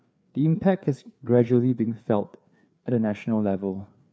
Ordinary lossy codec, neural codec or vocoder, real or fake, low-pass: none; codec, 16 kHz, 4 kbps, FreqCodec, larger model; fake; none